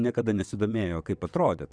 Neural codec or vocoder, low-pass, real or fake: vocoder, 22.05 kHz, 80 mel bands, WaveNeXt; 9.9 kHz; fake